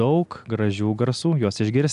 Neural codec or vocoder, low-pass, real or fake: none; 10.8 kHz; real